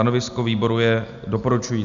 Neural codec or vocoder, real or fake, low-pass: none; real; 7.2 kHz